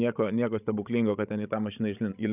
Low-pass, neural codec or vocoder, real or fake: 3.6 kHz; codec, 16 kHz, 16 kbps, FreqCodec, larger model; fake